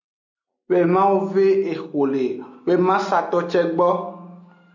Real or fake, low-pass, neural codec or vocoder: real; 7.2 kHz; none